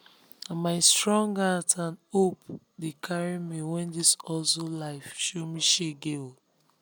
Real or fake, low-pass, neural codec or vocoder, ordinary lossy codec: real; none; none; none